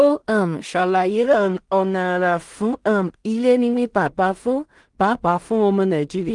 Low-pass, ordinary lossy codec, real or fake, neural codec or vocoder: 10.8 kHz; Opus, 24 kbps; fake; codec, 16 kHz in and 24 kHz out, 0.4 kbps, LongCat-Audio-Codec, two codebook decoder